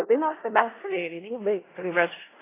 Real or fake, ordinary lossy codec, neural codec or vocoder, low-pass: fake; AAC, 16 kbps; codec, 16 kHz in and 24 kHz out, 0.4 kbps, LongCat-Audio-Codec, four codebook decoder; 3.6 kHz